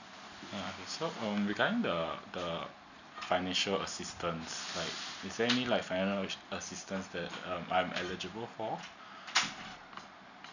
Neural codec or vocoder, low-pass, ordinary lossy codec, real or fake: none; 7.2 kHz; none; real